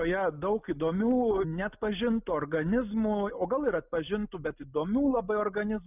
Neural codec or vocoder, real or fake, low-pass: none; real; 3.6 kHz